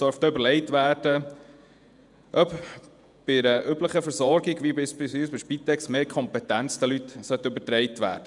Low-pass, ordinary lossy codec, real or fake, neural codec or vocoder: 10.8 kHz; none; fake; vocoder, 48 kHz, 128 mel bands, Vocos